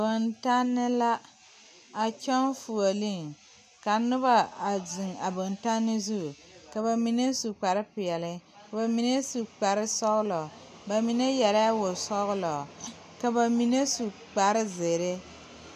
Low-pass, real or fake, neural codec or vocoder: 14.4 kHz; real; none